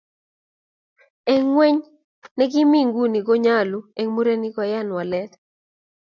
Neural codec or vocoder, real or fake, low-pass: none; real; 7.2 kHz